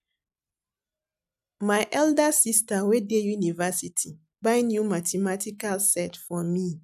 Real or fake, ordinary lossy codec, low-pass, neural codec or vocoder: real; none; 14.4 kHz; none